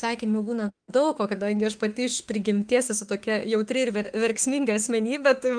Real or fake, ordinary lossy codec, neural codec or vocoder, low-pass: fake; Opus, 24 kbps; autoencoder, 48 kHz, 32 numbers a frame, DAC-VAE, trained on Japanese speech; 9.9 kHz